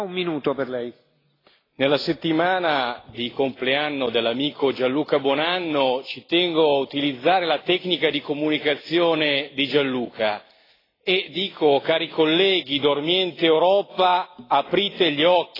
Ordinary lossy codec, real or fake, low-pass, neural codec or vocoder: AAC, 24 kbps; real; 5.4 kHz; none